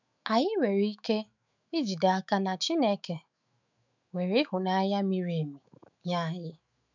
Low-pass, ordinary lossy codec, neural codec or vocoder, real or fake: 7.2 kHz; none; autoencoder, 48 kHz, 128 numbers a frame, DAC-VAE, trained on Japanese speech; fake